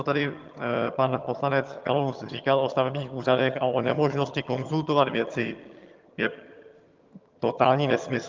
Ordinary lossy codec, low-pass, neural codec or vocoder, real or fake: Opus, 32 kbps; 7.2 kHz; vocoder, 22.05 kHz, 80 mel bands, HiFi-GAN; fake